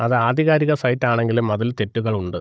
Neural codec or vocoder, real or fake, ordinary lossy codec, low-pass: codec, 16 kHz, 16 kbps, FunCodec, trained on Chinese and English, 50 frames a second; fake; none; none